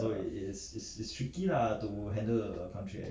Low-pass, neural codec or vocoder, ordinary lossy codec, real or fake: none; none; none; real